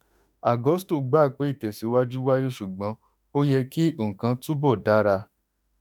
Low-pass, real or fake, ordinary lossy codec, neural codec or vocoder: 19.8 kHz; fake; none; autoencoder, 48 kHz, 32 numbers a frame, DAC-VAE, trained on Japanese speech